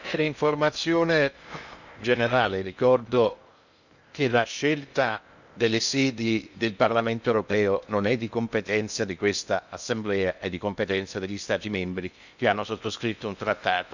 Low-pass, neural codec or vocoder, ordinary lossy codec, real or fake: 7.2 kHz; codec, 16 kHz in and 24 kHz out, 0.6 kbps, FocalCodec, streaming, 2048 codes; none; fake